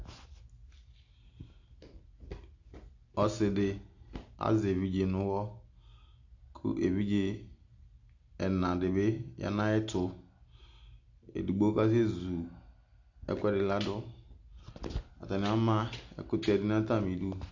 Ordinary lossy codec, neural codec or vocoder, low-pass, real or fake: AAC, 48 kbps; none; 7.2 kHz; real